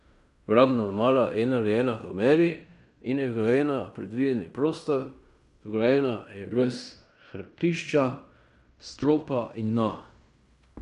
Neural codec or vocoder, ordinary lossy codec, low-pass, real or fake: codec, 16 kHz in and 24 kHz out, 0.9 kbps, LongCat-Audio-Codec, fine tuned four codebook decoder; none; 10.8 kHz; fake